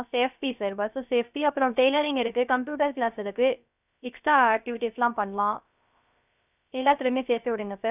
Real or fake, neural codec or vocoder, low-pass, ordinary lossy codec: fake; codec, 16 kHz, 0.3 kbps, FocalCodec; 3.6 kHz; none